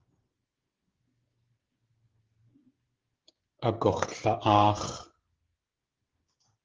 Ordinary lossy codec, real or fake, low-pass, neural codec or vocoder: Opus, 16 kbps; fake; 7.2 kHz; codec, 16 kHz, 16 kbps, FreqCodec, smaller model